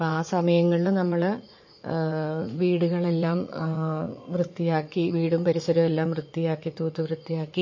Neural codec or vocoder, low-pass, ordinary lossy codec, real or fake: vocoder, 44.1 kHz, 80 mel bands, Vocos; 7.2 kHz; MP3, 32 kbps; fake